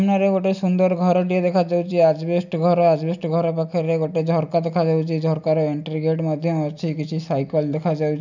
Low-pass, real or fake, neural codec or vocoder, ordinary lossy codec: 7.2 kHz; real; none; none